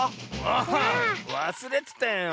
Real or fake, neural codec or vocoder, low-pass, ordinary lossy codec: real; none; none; none